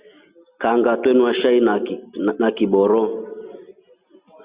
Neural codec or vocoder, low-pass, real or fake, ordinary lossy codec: none; 3.6 kHz; real; Opus, 64 kbps